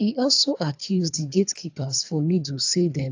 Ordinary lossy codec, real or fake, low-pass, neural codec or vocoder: AAC, 48 kbps; fake; 7.2 kHz; codec, 24 kHz, 3 kbps, HILCodec